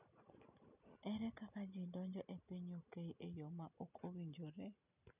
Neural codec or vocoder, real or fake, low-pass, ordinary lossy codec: none; real; 3.6 kHz; none